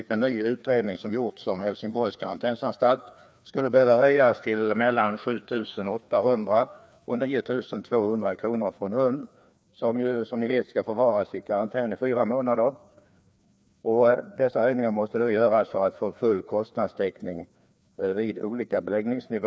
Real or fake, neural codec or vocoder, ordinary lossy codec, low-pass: fake; codec, 16 kHz, 2 kbps, FreqCodec, larger model; none; none